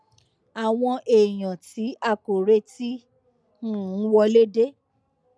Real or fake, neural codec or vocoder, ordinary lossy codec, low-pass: real; none; none; none